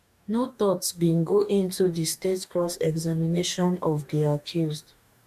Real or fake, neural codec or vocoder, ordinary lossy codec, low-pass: fake; codec, 44.1 kHz, 2.6 kbps, DAC; none; 14.4 kHz